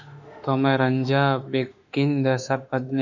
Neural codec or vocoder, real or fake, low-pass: autoencoder, 48 kHz, 32 numbers a frame, DAC-VAE, trained on Japanese speech; fake; 7.2 kHz